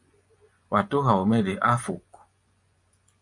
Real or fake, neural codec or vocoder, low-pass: fake; vocoder, 24 kHz, 100 mel bands, Vocos; 10.8 kHz